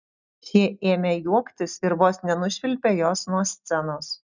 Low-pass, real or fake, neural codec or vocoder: 7.2 kHz; real; none